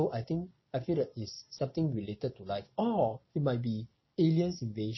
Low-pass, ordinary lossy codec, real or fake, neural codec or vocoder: 7.2 kHz; MP3, 24 kbps; real; none